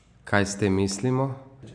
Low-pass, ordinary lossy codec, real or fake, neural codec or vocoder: 9.9 kHz; none; real; none